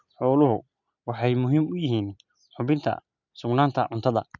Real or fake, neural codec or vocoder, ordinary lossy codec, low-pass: real; none; none; 7.2 kHz